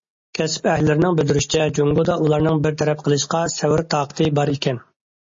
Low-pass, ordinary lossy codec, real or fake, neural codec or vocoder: 7.2 kHz; MP3, 32 kbps; real; none